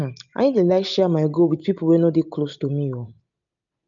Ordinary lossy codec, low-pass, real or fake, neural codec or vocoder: none; 7.2 kHz; real; none